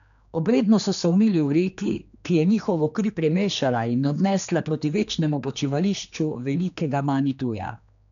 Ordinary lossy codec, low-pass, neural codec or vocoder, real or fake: none; 7.2 kHz; codec, 16 kHz, 2 kbps, X-Codec, HuBERT features, trained on general audio; fake